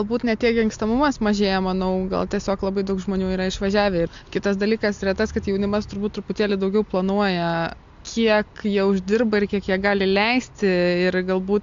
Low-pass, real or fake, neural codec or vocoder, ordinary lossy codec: 7.2 kHz; real; none; AAC, 64 kbps